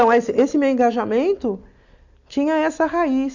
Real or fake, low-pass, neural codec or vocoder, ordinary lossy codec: real; 7.2 kHz; none; none